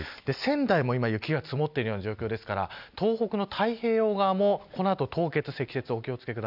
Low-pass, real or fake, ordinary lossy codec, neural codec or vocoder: 5.4 kHz; real; none; none